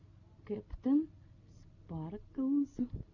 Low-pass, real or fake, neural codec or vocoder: 7.2 kHz; real; none